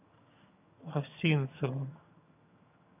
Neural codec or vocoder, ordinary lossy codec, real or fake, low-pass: vocoder, 22.05 kHz, 80 mel bands, HiFi-GAN; AAC, 24 kbps; fake; 3.6 kHz